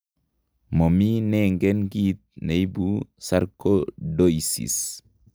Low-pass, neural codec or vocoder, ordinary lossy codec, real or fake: none; none; none; real